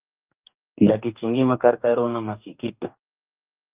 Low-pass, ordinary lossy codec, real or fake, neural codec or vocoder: 3.6 kHz; Opus, 24 kbps; fake; codec, 44.1 kHz, 2.6 kbps, DAC